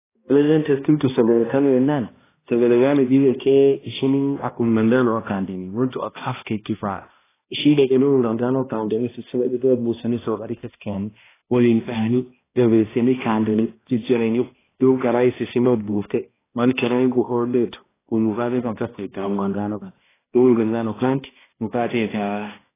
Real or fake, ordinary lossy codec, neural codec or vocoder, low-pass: fake; AAC, 16 kbps; codec, 16 kHz, 1 kbps, X-Codec, HuBERT features, trained on balanced general audio; 3.6 kHz